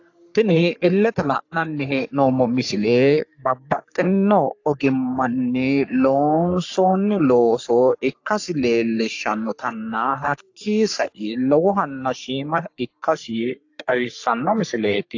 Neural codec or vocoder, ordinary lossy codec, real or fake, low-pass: codec, 44.1 kHz, 3.4 kbps, Pupu-Codec; AAC, 48 kbps; fake; 7.2 kHz